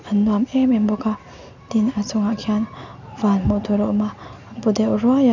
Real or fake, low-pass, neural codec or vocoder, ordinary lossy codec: real; 7.2 kHz; none; none